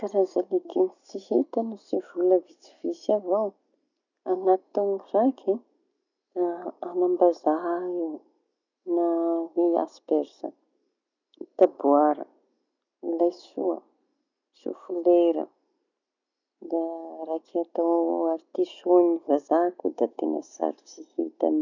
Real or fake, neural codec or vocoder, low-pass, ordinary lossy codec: real; none; 7.2 kHz; none